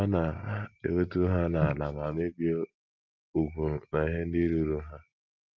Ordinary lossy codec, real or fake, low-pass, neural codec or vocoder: Opus, 32 kbps; real; 7.2 kHz; none